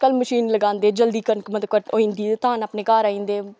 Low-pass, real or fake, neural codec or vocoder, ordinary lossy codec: none; real; none; none